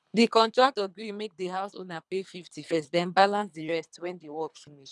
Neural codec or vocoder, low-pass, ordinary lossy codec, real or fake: codec, 24 kHz, 3 kbps, HILCodec; none; none; fake